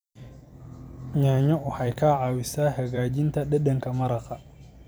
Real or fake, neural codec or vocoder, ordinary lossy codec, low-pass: fake; vocoder, 44.1 kHz, 128 mel bands every 256 samples, BigVGAN v2; none; none